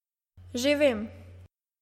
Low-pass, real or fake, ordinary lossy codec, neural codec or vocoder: 19.8 kHz; real; MP3, 64 kbps; none